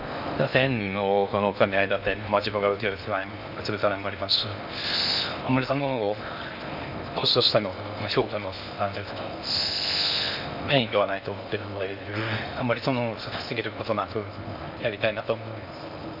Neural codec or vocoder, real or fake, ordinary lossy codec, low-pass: codec, 16 kHz in and 24 kHz out, 0.6 kbps, FocalCodec, streaming, 4096 codes; fake; none; 5.4 kHz